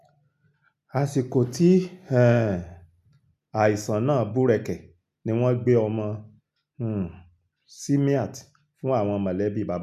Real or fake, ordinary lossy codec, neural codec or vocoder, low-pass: real; none; none; 14.4 kHz